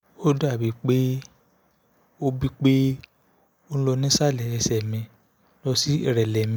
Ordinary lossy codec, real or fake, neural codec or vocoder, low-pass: none; real; none; none